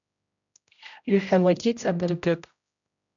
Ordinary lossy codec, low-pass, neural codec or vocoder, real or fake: MP3, 96 kbps; 7.2 kHz; codec, 16 kHz, 0.5 kbps, X-Codec, HuBERT features, trained on general audio; fake